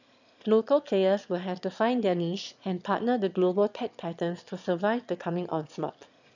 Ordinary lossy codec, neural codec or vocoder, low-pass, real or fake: none; autoencoder, 22.05 kHz, a latent of 192 numbers a frame, VITS, trained on one speaker; 7.2 kHz; fake